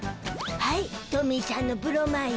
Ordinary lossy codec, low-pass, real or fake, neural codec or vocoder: none; none; real; none